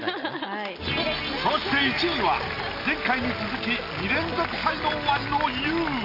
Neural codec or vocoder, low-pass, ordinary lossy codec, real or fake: none; 5.4 kHz; AAC, 32 kbps; real